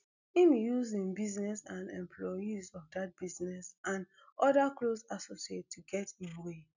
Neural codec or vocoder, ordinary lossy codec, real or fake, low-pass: none; none; real; 7.2 kHz